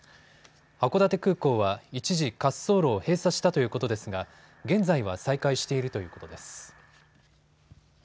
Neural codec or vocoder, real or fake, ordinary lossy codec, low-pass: none; real; none; none